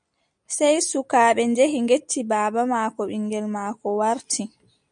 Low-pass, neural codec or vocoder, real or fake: 9.9 kHz; none; real